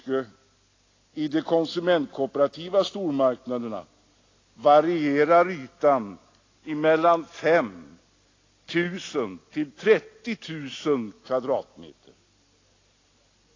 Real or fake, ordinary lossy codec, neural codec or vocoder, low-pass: real; AAC, 32 kbps; none; 7.2 kHz